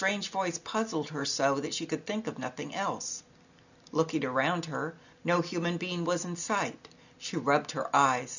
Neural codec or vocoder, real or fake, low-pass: none; real; 7.2 kHz